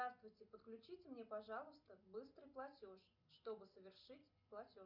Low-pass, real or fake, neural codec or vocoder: 5.4 kHz; real; none